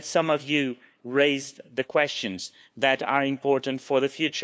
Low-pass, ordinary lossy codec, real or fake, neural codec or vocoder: none; none; fake; codec, 16 kHz, 2 kbps, FunCodec, trained on LibriTTS, 25 frames a second